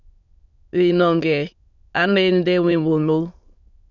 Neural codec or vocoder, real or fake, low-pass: autoencoder, 22.05 kHz, a latent of 192 numbers a frame, VITS, trained on many speakers; fake; 7.2 kHz